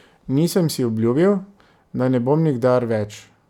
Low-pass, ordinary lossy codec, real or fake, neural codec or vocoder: 19.8 kHz; none; real; none